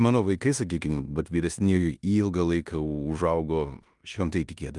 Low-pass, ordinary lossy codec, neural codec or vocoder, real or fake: 10.8 kHz; Opus, 32 kbps; codec, 16 kHz in and 24 kHz out, 0.9 kbps, LongCat-Audio-Codec, four codebook decoder; fake